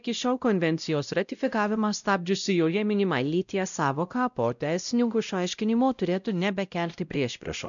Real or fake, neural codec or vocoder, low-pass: fake; codec, 16 kHz, 0.5 kbps, X-Codec, WavLM features, trained on Multilingual LibriSpeech; 7.2 kHz